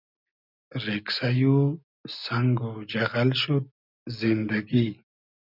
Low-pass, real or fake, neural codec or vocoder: 5.4 kHz; real; none